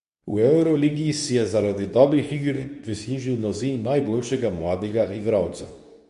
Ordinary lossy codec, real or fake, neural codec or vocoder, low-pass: none; fake; codec, 24 kHz, 0.9 kbps, WavTokenizer, medium speech release version 2; 10.8 kHz